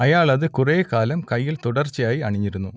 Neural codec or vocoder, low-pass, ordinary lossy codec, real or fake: none; none; none; real